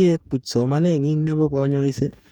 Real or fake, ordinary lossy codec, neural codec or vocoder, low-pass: fake; none; codec, 44.1 kHz, 2.6 kbps, DAC; 19.8 kHz